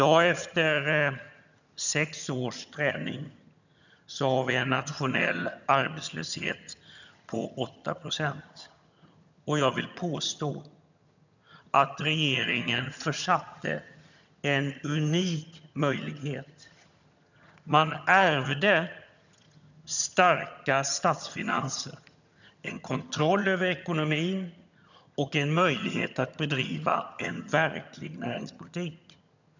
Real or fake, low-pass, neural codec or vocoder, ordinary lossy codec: fake; 7.2 kHz; vocoder, 22.05 kHz, 80 mel bands, HiFi-GAN; none